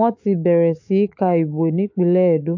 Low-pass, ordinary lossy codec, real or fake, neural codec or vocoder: 7.2 kHz; AAC, 48 kbps; fake; autoencoder, 48 kHz, 128 numbers a frame, DAC-VAE, trained on Japanese speech